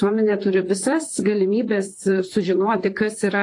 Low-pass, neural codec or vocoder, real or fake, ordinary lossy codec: 10.8 kHz; vocoder, 44.1 kHz, 128 mel bands, Pupu-Vocoder; fake; AAC, 48 kbps